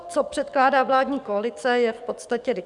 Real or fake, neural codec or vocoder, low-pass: fake; codec, 44.1 kHz, 7.8 kbps, DAC; 10.8 kHz